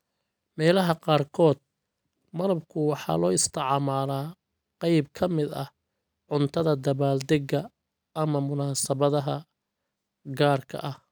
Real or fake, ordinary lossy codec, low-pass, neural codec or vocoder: real; none; none; none